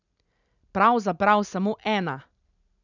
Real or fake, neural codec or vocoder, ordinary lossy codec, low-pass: real; none; none; 7.2 kHz